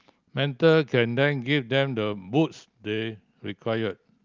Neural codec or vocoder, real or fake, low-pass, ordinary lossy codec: none; real; 7.2 kHz; Opus, 24 kbps